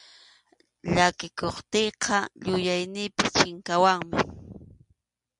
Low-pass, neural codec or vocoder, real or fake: 10.8 kHz; none; real